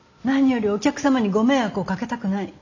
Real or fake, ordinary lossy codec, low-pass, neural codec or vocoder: real; none; 7.2 kHz; none